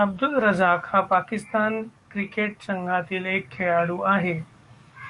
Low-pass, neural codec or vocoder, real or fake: 10.8 kHz; codec, 44.1 kHz, 7.8 kbps, DAC; fake